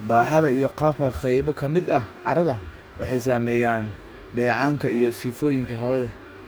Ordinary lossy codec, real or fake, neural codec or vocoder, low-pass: none; fake; codec, 44.1 kHz, 2.6 kbps, DAC; none